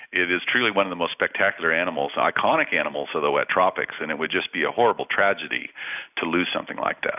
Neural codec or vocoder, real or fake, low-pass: none; real; 3.6 kHz